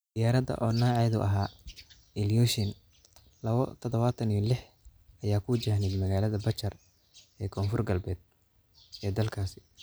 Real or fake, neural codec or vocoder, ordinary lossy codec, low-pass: real; none; none; none